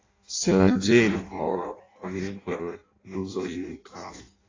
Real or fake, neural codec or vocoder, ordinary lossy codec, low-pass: fake; codec, 16 kHz in and 24 kHz out, 0.6 kbps, FireRedTTS-2 codec; AAC, 32 kbps; 7.2 kHz